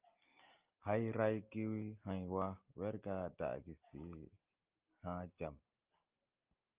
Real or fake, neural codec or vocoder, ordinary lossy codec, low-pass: real; none; Opus, 24 kbps; 3.6 kHz